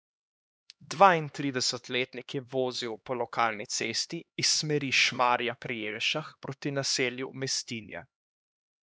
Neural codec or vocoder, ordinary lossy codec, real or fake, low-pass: codec, 16 kHz, 2 kbps, X-Codec, HuBERT features, trained on LibriSpeech; none; fake; none